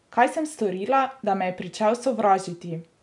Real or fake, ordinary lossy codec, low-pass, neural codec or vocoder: real; none; 10.8 kHz; none